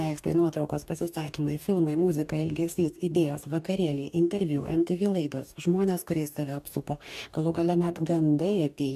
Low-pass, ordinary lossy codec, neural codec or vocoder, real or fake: 14.4 kHz; AAC, 96 kbps; codec, 44.1 kHz, 2.6 kbps, DAC; fake